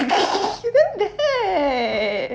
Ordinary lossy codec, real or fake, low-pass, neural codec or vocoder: none; real; none; none